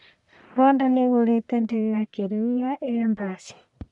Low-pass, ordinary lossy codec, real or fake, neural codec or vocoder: 10.8 kHz; none; fake; codec, 44.1 kHz, 1.7 kbps, Pupu-Codec